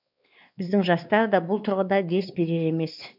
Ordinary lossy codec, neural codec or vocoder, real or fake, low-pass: none; codec, 16 kHz, 2 kbps, X-Codec, WavLM features, trained on Multilingual LibriSpeech; fake; 5.4 kHz